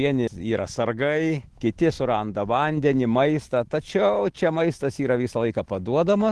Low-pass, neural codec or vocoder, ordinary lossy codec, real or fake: 10.8 kHz; autoencoder, 48 kHz, 128 numbers a frame, DAC-VAE, trained on Japanese speech; Opus, 16 kbps; fake